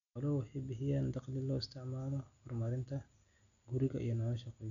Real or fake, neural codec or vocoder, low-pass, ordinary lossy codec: real; none; 7.2 kHz; none